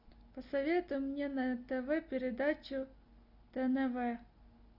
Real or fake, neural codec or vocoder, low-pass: real; none; 5.4 kHz